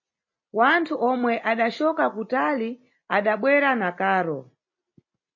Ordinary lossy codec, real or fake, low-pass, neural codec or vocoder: MP3, 32 kbps; real; 7.2 kHz; none